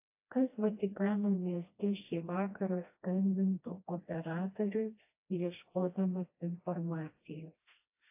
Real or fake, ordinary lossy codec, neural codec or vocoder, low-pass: fake; AAC, 32 kbps; codec, 16 kHz, 1 kbps, FreqCodec, smaller model; 3.6 kHz